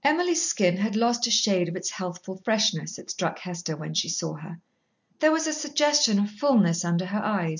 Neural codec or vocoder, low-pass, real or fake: none; 7.2 kHz; real